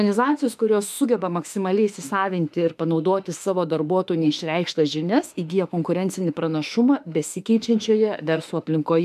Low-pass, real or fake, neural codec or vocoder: 14.4 kHz; fake; autoencoder, 48 kHz, 32 numbers a frame, DAC-VAE, trained on Japanese speech